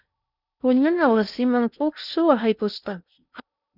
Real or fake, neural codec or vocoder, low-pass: fake; codec, 16 kHz in and 24 kHz out, 0.8 kbps, FocalCodec, streaming, 65536 codes; 5.4 kHz